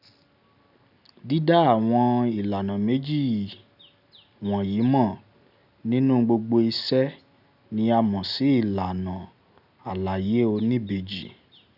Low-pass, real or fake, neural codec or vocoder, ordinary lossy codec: 5.4 kHz; real; none; none